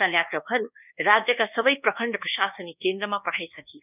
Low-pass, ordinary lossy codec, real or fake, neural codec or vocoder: 3.6 kHz; none; fake; codec, 24 kHz, 1.2 kbps, DualCodec